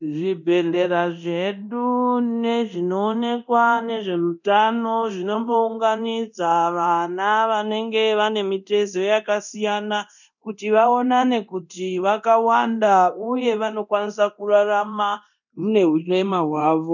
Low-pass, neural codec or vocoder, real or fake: 7.2 kHz; codec, 24 kHz, 0.9 kbps, DualCodec; fake